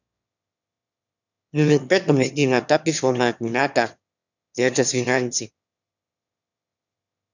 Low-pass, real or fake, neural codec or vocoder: 7.2 kHz; fake; autoencoder, 22.05 kHz, a latent of 192 numbers a frame, VITS, trained on one speaker